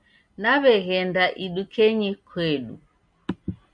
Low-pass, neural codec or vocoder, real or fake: 9.9 kHz; none; real